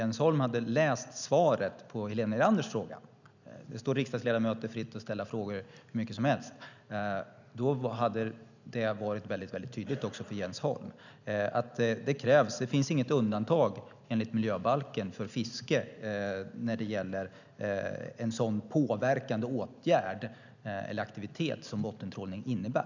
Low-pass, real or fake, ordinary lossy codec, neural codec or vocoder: 7.2 kHz; real; none; none